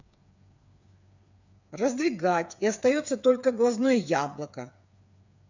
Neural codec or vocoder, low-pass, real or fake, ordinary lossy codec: codec, 16 kHz, 8 kbps, FreqCodec, smaller model; 7.2 kHz; fake; none